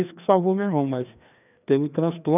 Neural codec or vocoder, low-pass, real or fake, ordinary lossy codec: codec, 16 kHz, 2 kbps, FreqCodec, larger model; 3.6 kHz; fake; none